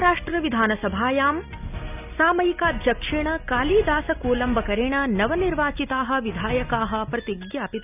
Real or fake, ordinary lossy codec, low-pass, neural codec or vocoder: real; none; 3.6 kHz; none